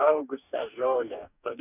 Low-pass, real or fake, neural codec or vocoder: 3.6 kHz; fake; codec, 44.1 kHz, 2.6 kbps, DAC